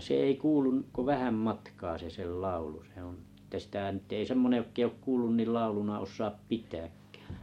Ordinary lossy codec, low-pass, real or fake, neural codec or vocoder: MP3, 64 kbps; 19.8 kHz; real; none